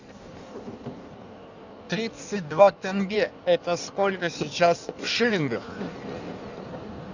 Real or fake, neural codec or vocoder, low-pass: fake; codec, 16 kHz in and 24 kHz out, 1.1 kbps, FireRedTTS-2 codec; 7.2 kHz